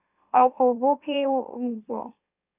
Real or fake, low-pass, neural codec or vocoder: fake; 3.6 kHz; autoencoder, 44.1 kHz, a latent of 192 numbers a frame, MeloTTS